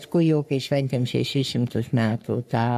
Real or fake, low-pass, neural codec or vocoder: fake; 14.4 kHz; codec, 44.1 kHz, 3.4 kbps, Pupu-Codec